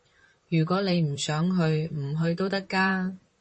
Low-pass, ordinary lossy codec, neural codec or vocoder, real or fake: 10.8 kHz; MP3, 32 kbps; vocoder, 44.1 kHz, 128 mel bands, Pupu-Vocoder; fake